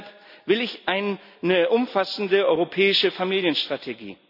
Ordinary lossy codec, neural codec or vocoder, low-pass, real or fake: none; none; 5.4 kHz; real